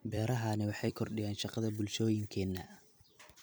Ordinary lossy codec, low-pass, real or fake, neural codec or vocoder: none; none; fake; vocoder, 44.1 kHz, 128 mel bands every 256 samples, BigVGAN v2